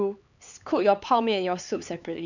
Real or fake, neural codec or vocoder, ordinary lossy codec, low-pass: fake; codec, 16 kHz, 4 kbps, X-Codec, HuBERT features, trained on LibriSpeech; none; 7.2 kHz